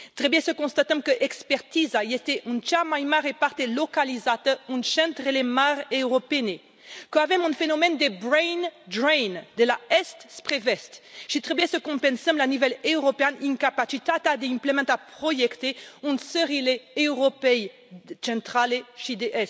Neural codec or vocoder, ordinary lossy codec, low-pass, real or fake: none; none; none; real